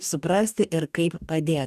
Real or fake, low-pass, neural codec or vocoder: fake; 14.4 kHz; codec, 44.1 kHz, 2.6 kbps, DAC